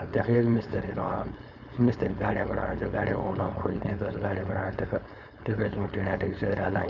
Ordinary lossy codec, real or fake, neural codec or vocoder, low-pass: none; fake; codec, 16 kHz, 4.8 kbps, FACodec; 7.2 kHz